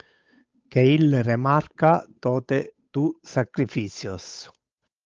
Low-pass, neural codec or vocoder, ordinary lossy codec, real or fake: 7.2 kHz; codec, 16 kHz, 8 kbps, FunCodec, trained on Chinese and English, 25 frames a second; Opus, 24 kbps; fake